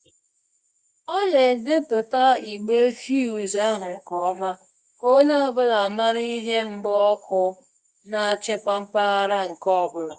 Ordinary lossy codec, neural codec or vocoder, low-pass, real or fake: Opus, 64 kbps; codec, 24 kHz, 0.9 kbps, WavTokenizer, medium music audio release; 10.8 kHz; fake